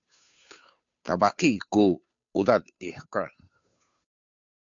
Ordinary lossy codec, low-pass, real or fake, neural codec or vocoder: MP3, 64 kbps; 7.2 kHz; fake; codec, 16 kHz, 2 kbps, FunCodec, trained on Chinese and English, 25 frames a second